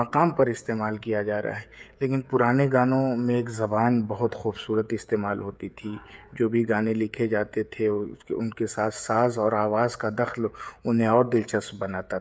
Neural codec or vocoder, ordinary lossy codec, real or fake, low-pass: codec, 16 kHz, 16 kbps, FreqCodec, smaller model; none; fake; none